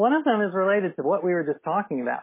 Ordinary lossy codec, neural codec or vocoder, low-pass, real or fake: MP3, 16 kbps; none; 3.6 kHz; real